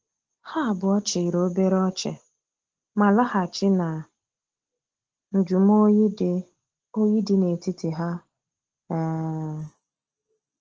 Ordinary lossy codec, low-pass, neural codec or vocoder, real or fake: Opus, 16 kbps; 7.2 kHz; none; real